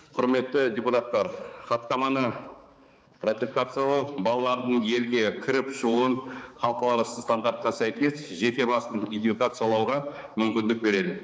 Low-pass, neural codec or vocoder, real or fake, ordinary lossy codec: none; codec, 16 kHz, 4 kbps, X-Codec, HuBERT features, trained on balanced general audio; fake; none